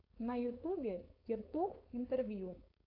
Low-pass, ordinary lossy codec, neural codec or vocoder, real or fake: 5.4 kHz; AAC, 32 kbps; codec, 16 kHz, 4.8 kbps, FACodec; fake